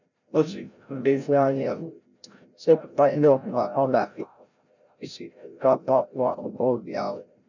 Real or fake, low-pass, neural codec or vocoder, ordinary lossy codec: fake; 7.2 kHz; codec, 16 kHz, 0.5 kbps, FreqCodec, larger model; none